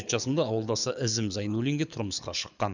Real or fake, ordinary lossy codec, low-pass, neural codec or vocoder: fake; none; 7.2 kHz; codec, 24 kHz, 6 kbps, HILCodec